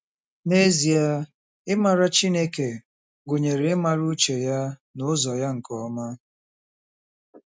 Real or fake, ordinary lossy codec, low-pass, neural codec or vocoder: real; none; none; none